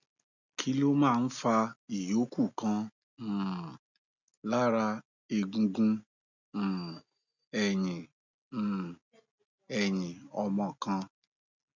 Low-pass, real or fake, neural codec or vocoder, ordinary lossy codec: 7.2 kHz; real; none; none